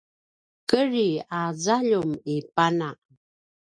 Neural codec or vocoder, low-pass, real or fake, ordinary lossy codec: none; 9.9 kHz; real; MP3, 48 kbps